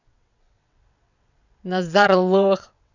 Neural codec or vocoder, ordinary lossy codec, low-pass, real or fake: vocoder, 22.05 kHz, 80 mel bands, WaveNeXt; none; 7.2 kHz; fake